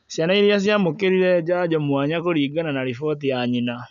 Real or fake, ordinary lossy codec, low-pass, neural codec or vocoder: real; none; 7.2 kHz; none